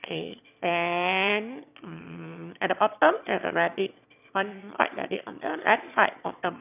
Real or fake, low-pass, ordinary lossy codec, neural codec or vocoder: fake; 3.6 kHz; none; autoencoder, 22.05 kHz, a latent of 192 numbers a frame, VITS, trained on one speaker